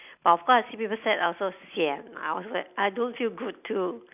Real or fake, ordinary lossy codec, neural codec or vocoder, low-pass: real; none; none; 3.6 kHz